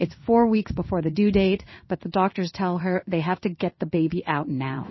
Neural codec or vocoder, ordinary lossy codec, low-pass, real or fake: codec, 16 kHz in and 24 kHz out, 1 kbps, XY-Tokenizer; MP3, 24 kbps; 7.2 kHz; fake